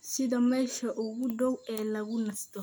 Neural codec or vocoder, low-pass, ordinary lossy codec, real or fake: none; none; none; real